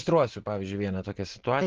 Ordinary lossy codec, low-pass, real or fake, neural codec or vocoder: Opus, 16 kbps; 7.2 kHz; real; none